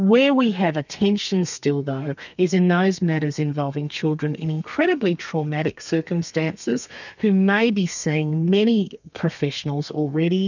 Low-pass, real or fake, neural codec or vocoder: 7.2 kHz; fake; codec, 32 kHz, 1.9 kbps, SNAC